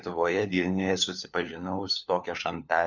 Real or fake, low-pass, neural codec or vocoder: fake; 7.2 kHz; codec, 16 kHz, 4 kbps, FunCodec, trained on LibriTTS, 50 frames a second